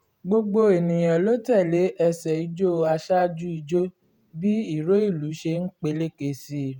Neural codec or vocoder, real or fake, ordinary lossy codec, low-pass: vocoder, 48 kHz, 128 mel bands, Vocos; fake; none; 19.8 kHz